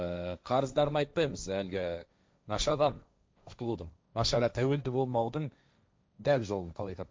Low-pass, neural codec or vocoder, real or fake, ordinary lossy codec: none; codec, 16 kHz, 1.1 kbps, Voila-Tokenizer; fake; none